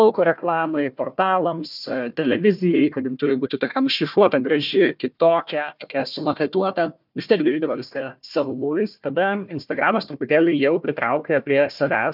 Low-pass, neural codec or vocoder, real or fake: 5.4 kHz; codec, 16 kHz, 1 kbps, FunCodec, trained on Chinese and English, 50 frames a second; fake